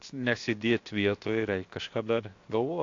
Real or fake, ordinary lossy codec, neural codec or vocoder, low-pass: fake; AAC, 48 kbps; codec, 16 kHz, 0.7 kbps, FocalCodec; 7.2 kHz